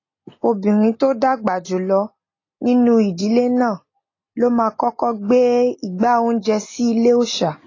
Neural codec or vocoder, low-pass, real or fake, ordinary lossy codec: none; 7.2 kHz; real; AAC, 32 kbps